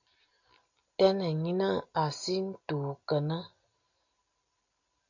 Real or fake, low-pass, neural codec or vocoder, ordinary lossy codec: real; 7.2 kHz; none; AAC, 48 kbps